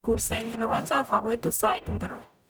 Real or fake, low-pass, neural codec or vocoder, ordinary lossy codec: fake; none; codec, 44.1 kHz, 0.9 kbps, DAC; none